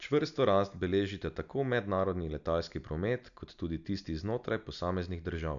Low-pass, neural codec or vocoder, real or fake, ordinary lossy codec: 7.2 kHz; none; real; none